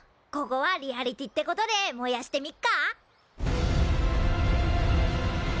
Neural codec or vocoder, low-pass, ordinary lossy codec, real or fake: none; none; none; real